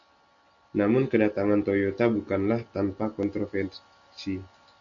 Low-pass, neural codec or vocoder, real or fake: 7.2 kHz; none; real